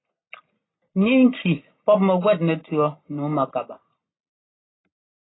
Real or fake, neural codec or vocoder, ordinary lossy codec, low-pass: real; none; AAC, 16 kbps; 7.2 kHz